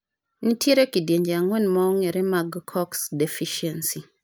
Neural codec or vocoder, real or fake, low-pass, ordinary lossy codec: none; real; none; none